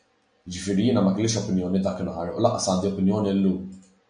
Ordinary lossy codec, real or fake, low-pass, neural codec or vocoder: MP3, 64 kbps; real; 9.9 kHz; none